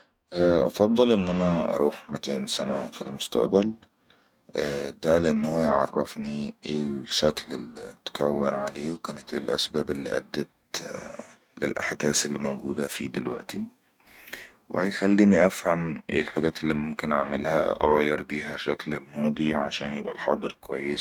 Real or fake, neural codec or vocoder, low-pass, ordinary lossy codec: fake; codec, 44.1 kHz, 2.6 kbps, DAC; 19.8 kHz; none